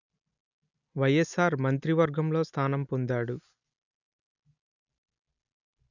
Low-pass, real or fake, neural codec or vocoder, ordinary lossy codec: 7.2 kHz; real; none; none